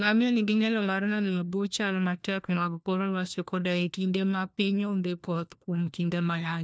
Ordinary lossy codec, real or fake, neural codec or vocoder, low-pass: none; fake; codec, 16 kHz, 1 kbps, FunCodec, trained on LibriTTS, 50 frames a second; none